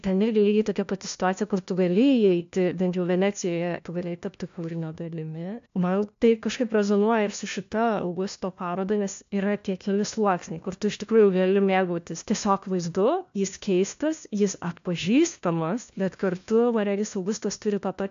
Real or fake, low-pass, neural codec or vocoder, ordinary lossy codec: fake; 7.2 kHz; codec, 16 kHz, 1 kbps, FunCodec, trained on LibriTTS, 50 frames a second; AAC, 64 kbps